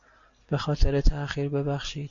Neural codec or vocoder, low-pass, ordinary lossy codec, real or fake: none; 7.2 kHz; AAC, 48 kbps; real